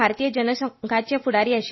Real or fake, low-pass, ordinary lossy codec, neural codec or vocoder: real; 7.2 kHz; MP3, 24 kbps; none